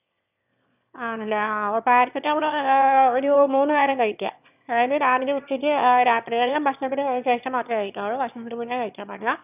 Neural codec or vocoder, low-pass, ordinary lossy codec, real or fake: autoencoder, 22.05 kHz, a latent of 192 numbers a frame, VITS, trained on one speaker; 3.6 kHz; none; fake